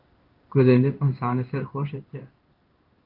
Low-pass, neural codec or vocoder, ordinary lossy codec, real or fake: 5.4 kHz; codec, 16 kHz in and 24 kHz out, 1 kbps, XY-Tokenizer; Opus, 24 kbps; fake